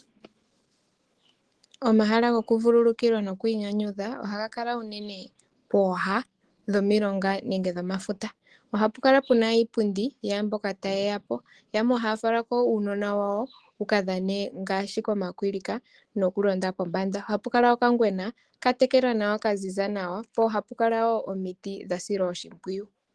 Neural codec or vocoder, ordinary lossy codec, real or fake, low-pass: autoencoder, 48 kHz, 128 numbers a frame, DAC-VAE, trained on Japanese speech; Opus, 16 kbps; fake; 10.8 kHz